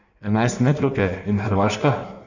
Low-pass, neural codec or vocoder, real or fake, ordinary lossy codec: 7.2 kHz; codec, 16 kHz in and 24 kHz out, 1.1 kbps, FireRedTTS-2 codec; fake; none